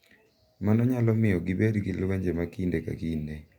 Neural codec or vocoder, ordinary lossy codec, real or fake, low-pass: none; Opus, 64 kbps; real; 19.8 kHz